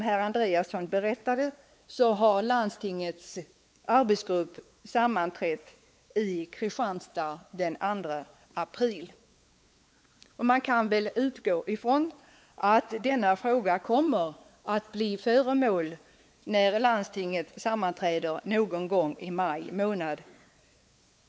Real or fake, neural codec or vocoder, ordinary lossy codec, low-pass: fake; codec, 16 kHz, 4 kbps, X-Codec, WavLM features, trained on Multilingual LibriSpeech; none; none